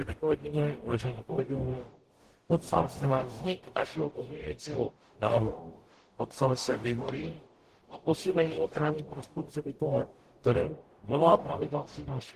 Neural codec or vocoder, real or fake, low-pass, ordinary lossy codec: codec, 44.1 kHz, 0.9 kbps, DAC; fake; 14.4 kHz; Opus, 16 kbps